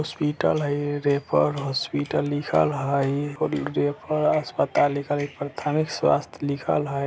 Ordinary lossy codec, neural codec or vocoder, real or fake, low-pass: none; none; real; none